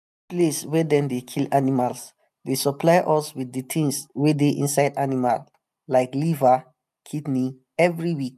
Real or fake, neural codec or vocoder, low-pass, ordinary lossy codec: real; none; 14.4 kHz; none